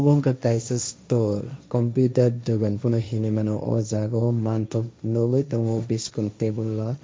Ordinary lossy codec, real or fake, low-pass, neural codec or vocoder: none; fake; none; codec, 16 kHz, 1.1 kbps, Voila-Tokenizer